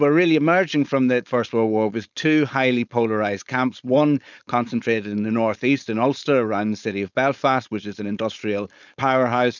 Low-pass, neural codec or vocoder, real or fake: 7.2 kHz; codec, 16 kHz, 4.8 kbps, FACodec; fake